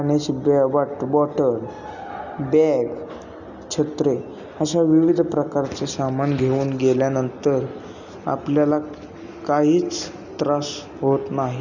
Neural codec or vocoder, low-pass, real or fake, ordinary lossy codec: none; 7.2 kHz; real; none